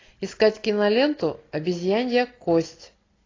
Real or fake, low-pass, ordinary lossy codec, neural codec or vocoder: real; 7.2 kHz; AAC, 32 kbps; none